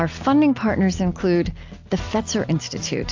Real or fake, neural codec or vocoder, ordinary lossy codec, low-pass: real; none; AAC, 48 kbps; 7.2 kHz